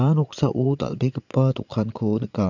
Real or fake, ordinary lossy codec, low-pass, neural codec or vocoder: fake; none; 7.2 kHz; vocoder, 22.05 kHz, 80 mel bands, Vocos